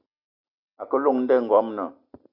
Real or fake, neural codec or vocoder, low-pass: real; none; 5.4 kHz